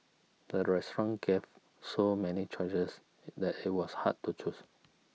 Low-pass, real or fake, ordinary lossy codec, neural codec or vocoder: none; real; none; none